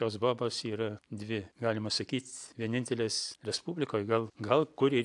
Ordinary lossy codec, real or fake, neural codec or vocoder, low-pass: AAC, 64 kbps; real; none; 10.8 kHz